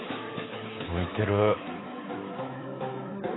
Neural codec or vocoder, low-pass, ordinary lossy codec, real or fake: codec, 24 kHz, 3.1 kbps, DualCodec; 7.2 kHz; AAC, 16 kbps; fake